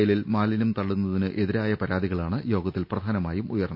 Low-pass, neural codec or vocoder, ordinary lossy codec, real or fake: 5.4 kHz; none; none; real